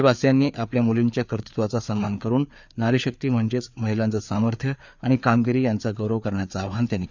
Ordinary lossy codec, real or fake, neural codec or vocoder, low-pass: none; fake; codec, 16 kHz, 4 kbps, FreqCodec, larger model; 7.2 kHz